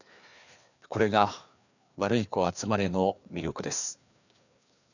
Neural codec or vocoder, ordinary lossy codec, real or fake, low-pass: codec, 16 kHz, 2 kbps, FreqCodec, larger model; none; fake; 7.2 kHz